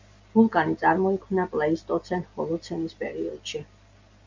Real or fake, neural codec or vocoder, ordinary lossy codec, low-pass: real; none; MP3, 64 kbps; 7.2 kHz